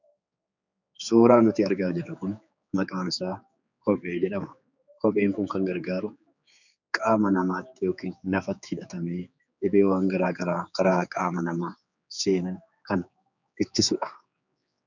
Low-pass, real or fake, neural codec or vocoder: 7.2 kHz; fake; codec, 16 kHz, 4 kbps, X-Codec, HuBERT features, trained on general audio